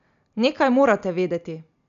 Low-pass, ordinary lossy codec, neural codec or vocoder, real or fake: 7.2 kHz; none; none; real